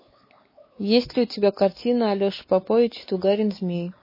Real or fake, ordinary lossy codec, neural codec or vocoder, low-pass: fake; MP3, 24 kbps; codec, 16 kHz, 4 kbps, X-Codec, WavLM features, trained on Multilingual LibriSpeech; 5.4 kHz